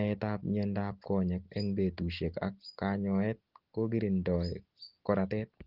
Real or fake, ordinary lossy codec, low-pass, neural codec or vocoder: real; Opus, 16 kbps; 5.4 kHz; none